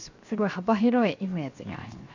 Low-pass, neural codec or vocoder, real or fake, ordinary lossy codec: 7.2 kHz; codec, 16 kHz, 0.7 kbps, FocalCodec; fake; none